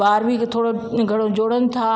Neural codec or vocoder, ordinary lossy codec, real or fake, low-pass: none; none; real; none